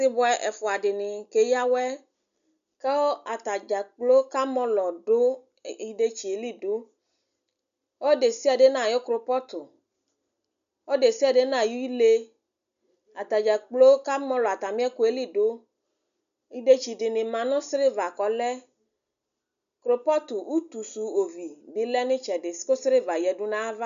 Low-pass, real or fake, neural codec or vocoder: 7.2 kHz; real; none